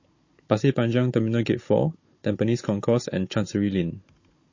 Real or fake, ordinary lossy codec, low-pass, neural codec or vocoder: fake; MP3, 32 kbps; 7.2 kHz; codec, 16 kHz, 16 kbps, FunCodec, trained on Chinese and English, 50 frames a second